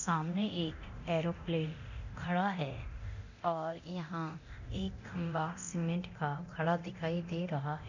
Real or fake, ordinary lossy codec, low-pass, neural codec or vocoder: fake; none; 7.2 kHz; codec, 24 kHz, 0.9 kbps, DualCodec